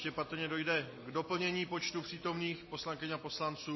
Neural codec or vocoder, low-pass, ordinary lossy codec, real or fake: none; 7.2 kHz; MP3, 24 kbps; real